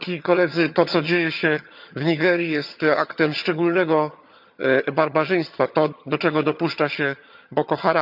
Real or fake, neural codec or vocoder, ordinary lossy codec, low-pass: fake; vocoder, 22.05 kHz, 80 mel bands, HiFi-GAN; none; 5.4 kHz